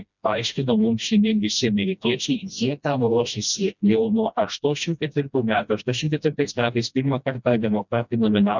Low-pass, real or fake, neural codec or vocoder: 7.2 kHz; fake; codec, 16 kHz, 1 kbps, FreqCodec, smaller model